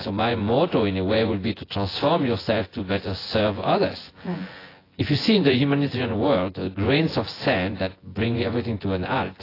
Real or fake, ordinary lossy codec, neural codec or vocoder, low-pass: fake; AAC, 24 kbps; vocoder, 24 kHz, 100 mel bands, Vocos; 5.4 kHz